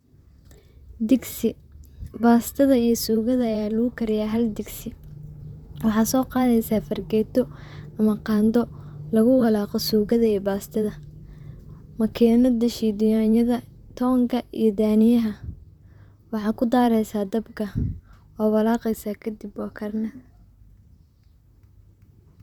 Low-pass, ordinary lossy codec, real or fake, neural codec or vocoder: 19.8 kHz; none; fake; vocoder, 44.1 kHz, 128 mel bands, Pupu-Vocoder